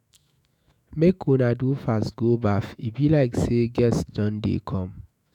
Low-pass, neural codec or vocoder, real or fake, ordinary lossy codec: 19.8 kHz; autoencoder, 48 kHz, 128 numbers a frame, DAC-VAE, trained on Japanese speech; fake; none